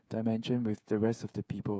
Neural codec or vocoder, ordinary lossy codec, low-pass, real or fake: codec, 16 kHz, 8 kbps, FreqCodec, smaller model; none; none; fake